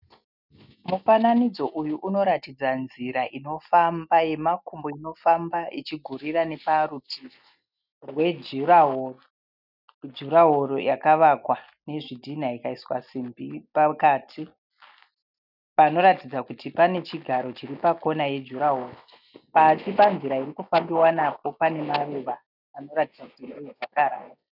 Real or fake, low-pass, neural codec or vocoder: real; 5.4 kHz; none